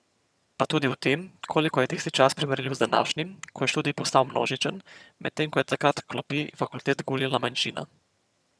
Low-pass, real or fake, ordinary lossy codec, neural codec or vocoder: none; fake; none; vocoder, 22.05 kHz, 80 mel bands, HiFi-GAN